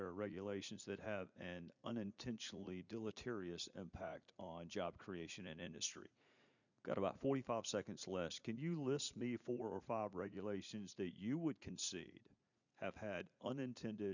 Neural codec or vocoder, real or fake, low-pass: vocoder, 44.1 kHz, 80 mel bands, Vocos; fake; 7.2 kHz